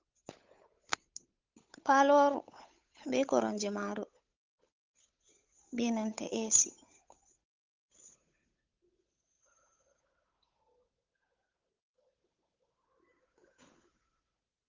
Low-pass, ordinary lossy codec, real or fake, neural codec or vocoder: 7.2 kHz; Opus, 24 kbps; fake; codec, 16 kHz, 8 kbps, FunCodec, trained on Chinese and English, 25 frames a second